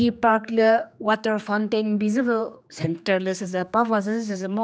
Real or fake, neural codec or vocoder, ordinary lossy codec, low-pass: fake; codec, 16 kHz, 2 kbps, X-Codec, HuBERT features, trained on general audio; none; none